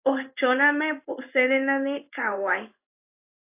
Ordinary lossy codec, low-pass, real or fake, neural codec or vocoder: AAC, 32 kbps; 3.6 kHz; fake; codec, 16 kHz in and 24 kHz out, 1 kbps, XY-Tokenizer